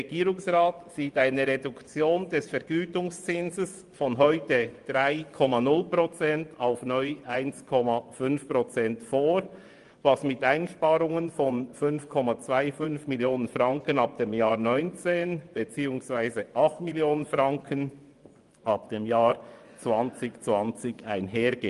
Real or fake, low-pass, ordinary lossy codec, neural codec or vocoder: fake; 10.8 kHz; Opus, 32 kbps; vocoder, 24 kHz, 100 mel bands, Vocos